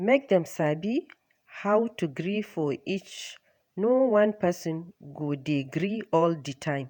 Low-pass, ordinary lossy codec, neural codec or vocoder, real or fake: 19.8 kHz; none; vocoder, 48 kHz, 128 mel bands, Vocos; fake